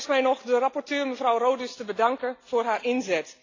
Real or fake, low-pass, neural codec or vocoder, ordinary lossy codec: real; 7.2 kHz; none; AAC, 32 kbps